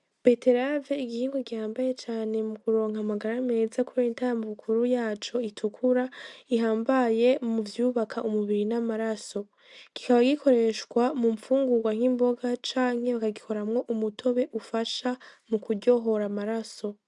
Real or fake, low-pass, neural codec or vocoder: real; 10.8 kHz; none